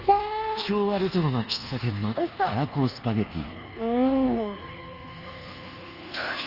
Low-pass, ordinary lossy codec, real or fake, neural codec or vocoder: 5.4 kHz; Opus, 24 kbps; fake; codec, 24 kHz, 1.2 kbps, DualCodec